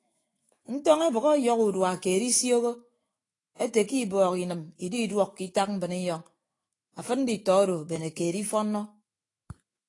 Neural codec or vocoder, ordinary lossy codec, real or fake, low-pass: autoencoder, 48 kHz, 128 numbers a frame, DAC-VAE, trained on Japanese speech; AAC, 32 kbps; fake; 10.8 kHz